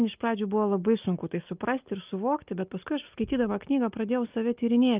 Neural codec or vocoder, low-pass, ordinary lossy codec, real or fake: none; 3.6 kHz; Opus, 24 kbps; real